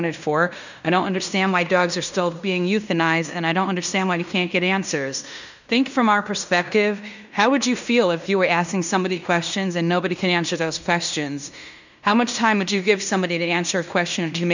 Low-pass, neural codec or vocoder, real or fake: 7.2 kHz; codec, 16 kHz in and 24 kHz out, 0.9 kbps, LongCat-Audio-Codec, fine tuned four codebook decoder; fake